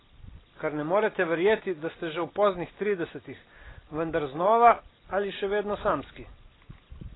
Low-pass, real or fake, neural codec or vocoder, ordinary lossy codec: 7.2 kHz; real; none; AAC, 16 kbps